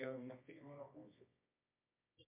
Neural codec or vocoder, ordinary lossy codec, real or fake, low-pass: codec, 24 kHz, 0.9 kbps, WavTokenizer, medium music audio release; MP3, 32 kbps; fake; 3.6 kHz